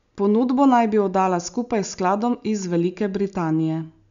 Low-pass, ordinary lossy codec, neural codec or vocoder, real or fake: 7.2 kHz; none; none; real